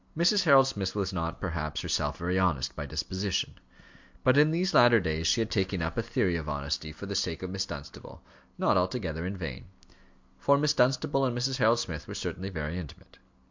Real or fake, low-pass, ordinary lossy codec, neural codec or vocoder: real; 7.2 kHz; MP3, 64 kbps; none